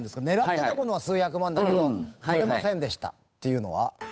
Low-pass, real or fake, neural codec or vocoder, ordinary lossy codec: none; fake; codec, 16 kHz, 8 kbps, FunCodec, trained on Chinese and English, 25 frames a second; none